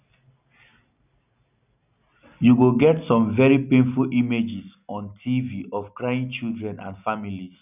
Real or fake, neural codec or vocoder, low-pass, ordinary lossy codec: real; none; 3.6 kHz; none